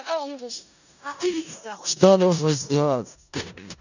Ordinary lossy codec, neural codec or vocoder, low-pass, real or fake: none; codec, 16 kHz in and 24 kHz out, 0.4 kbps, LongCat-Audio-Codec, four codebook decoder; 7.2 kHz; fake